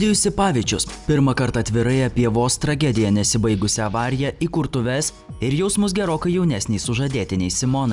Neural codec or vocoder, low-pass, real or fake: none; 10.8 kHz; real